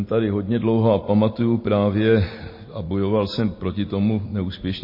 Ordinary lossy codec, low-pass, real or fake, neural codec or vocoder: MP3, 24 kbps; 5.4 kHz; fake; vocoder, 44.1 kHz, 128 mel bands every 256 samples, BigVGAN v2